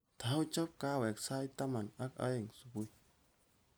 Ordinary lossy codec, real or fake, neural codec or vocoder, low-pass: none; real; none; none